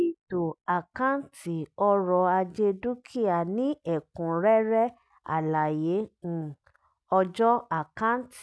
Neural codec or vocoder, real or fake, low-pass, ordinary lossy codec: none; real; none; none